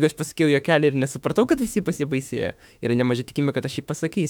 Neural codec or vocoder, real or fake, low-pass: autoencoder, 48 kHz, 32 numbers a frame, DAC-VAE, trained on Japanese speech; fake; 19.8 kHz